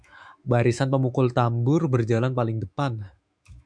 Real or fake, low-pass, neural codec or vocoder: fake; 9.9 kHz; autoencoder, 48 kHz, 128 numbers a frame, DAC-VAE, trained on Japanese speech